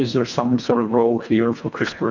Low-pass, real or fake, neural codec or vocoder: 7.2 kHz; fake; codec, 24 kHz, 1.5 kbps, HILCodec